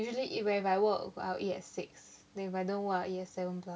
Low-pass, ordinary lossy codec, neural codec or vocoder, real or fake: none; none; none; real